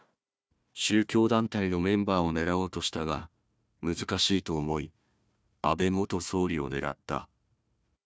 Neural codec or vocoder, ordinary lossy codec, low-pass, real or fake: codec, 16 kHz, 1 kbps, FunCodec, trained on Chinese and English, 50 frames a second; none; none; fake